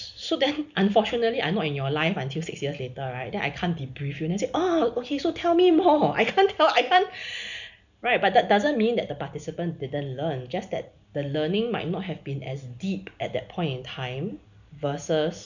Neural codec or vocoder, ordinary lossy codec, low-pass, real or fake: none; none; 7.2 kHz; real